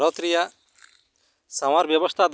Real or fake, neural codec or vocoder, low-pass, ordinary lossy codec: real; none; none; none